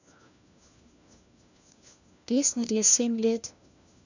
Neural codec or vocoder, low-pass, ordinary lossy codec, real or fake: codec, 16 kHz, 1 kbps, FunCodec, trained on LibriTTS, 50 frames a second; 7.2 kHz; none; fake